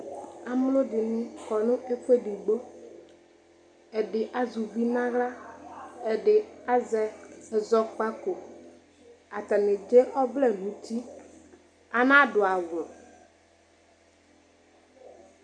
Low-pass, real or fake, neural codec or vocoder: 9.9 kHz; real; none